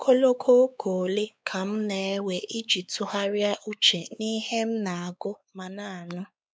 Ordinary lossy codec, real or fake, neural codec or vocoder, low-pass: none; fake; codec, 16 kHz, 4 kbps, X-Codec, WavLM features, trained on Multilingual LibriSpeech; none